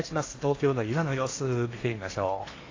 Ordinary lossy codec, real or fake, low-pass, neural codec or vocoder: AAC, 32 kbps; fake; 7.2 kHz; codec, 16 kHz in and 24 kHz out, 0.8 kbps, FocalCodec, streaming, 65536 codes